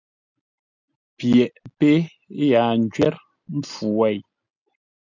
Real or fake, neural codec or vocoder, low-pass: real; none; 7.2 kHz